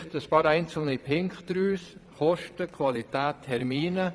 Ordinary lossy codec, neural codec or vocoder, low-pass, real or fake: none; vocoder, 22.05 kHz, 80 mel bands, Vocos; none; fake